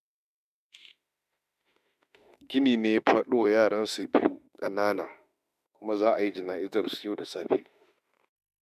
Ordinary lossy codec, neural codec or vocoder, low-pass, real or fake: none; autoencoder, 48 kHz, 32 numbers a frame, DAC-VAE, trained on Japanese speech; 14.4 kHz; fake